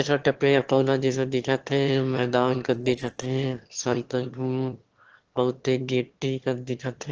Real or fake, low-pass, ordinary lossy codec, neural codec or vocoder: fake; 7.2 kHz; Opus, 16 kbps; autoencoder, 22.05 kHz, a latent of 192 numbers a frame, VITS, trained on one speaker